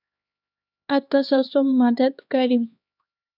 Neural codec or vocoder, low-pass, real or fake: codec, 16 kHz, 2 kbps, X-Codec, HuBERT features, trained on LibriSpeech; 5.4 kHz; fake